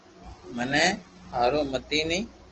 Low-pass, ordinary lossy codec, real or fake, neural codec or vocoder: 7.2 kHz; Opus, 16 kbps; real; none